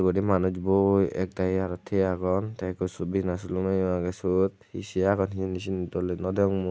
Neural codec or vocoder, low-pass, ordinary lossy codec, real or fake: none; none; none; real